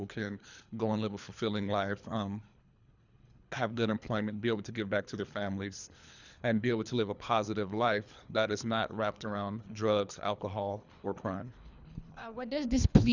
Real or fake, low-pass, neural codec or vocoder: fake; 7.2 kHz; codec, 24 kHz, 3 kbps, HILCodec